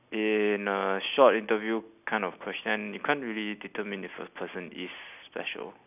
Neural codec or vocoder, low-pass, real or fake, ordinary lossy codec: none; 3.6 kHz; real; none